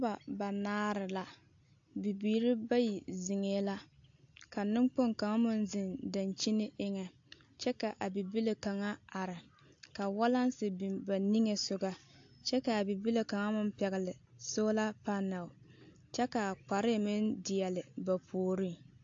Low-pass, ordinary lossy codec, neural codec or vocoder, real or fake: 7.2 kHz; AAC, 48 kbps; none; real